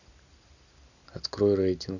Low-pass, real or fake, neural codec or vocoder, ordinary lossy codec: 7.2 kHz; real; none; none